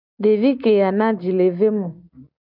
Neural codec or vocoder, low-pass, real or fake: none; 5.4 kHz; real